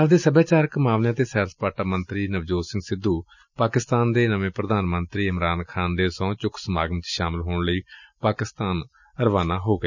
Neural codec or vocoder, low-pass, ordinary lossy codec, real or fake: none; 7.2 kHz; none; real